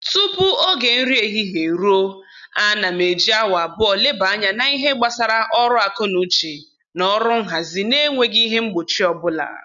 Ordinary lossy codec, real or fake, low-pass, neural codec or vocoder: none; real; 7.2 kHz; none